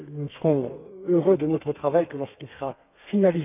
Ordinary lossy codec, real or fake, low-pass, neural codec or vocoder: none; fake; 3.6 kHz; codec, 32 kHz, 1.9 kbps, SNAC